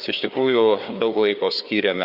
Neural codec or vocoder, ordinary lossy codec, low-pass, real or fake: codec, 16 kHz, 4 kbps, FreqCodec, larger model; Opus, 64 kbps; 5.4 kHz; fake